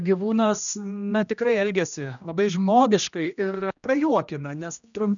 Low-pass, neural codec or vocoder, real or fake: 7.2 kHz; codec, 16 kHz, 1 kbps, X-Codec, HuBERT features, trained on general audio; fake